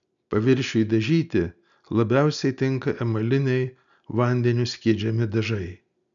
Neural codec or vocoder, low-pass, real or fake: none; 7.2 kHz; real